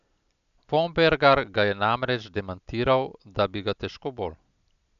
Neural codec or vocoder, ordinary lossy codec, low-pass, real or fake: none; none; 7.2 kHz; real